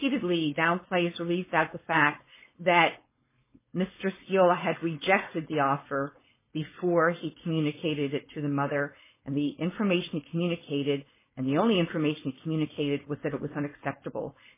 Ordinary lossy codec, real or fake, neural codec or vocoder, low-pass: MP3, 16 kbps; fake; codec, 16 kHz, 4.8 kbps, FACodec; 3.6 kHz